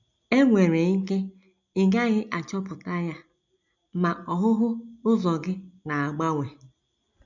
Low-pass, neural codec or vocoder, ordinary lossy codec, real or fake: 7.2 kHz; none; none; real